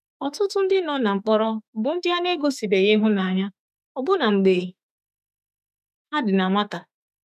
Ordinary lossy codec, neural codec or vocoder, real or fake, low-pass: none; autoencoder, 48 kHz, 32 numbers a frame, DAC-VAE, trained on Japanese speech; fake; 14.4 kHz